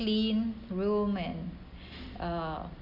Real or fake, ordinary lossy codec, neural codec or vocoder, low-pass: real; none; none; 5.4 kHz